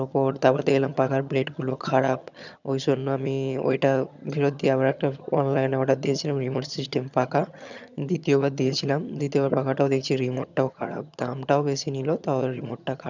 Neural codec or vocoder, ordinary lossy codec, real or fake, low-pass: vocoder, 22.05 kHz, 80 mel bands, HiFi-GAN; none; fake; 7.2 kHz